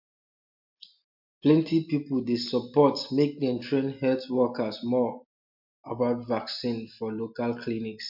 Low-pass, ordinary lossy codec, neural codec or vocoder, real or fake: 5.4 kHz; MP3, 48 kbps; none; real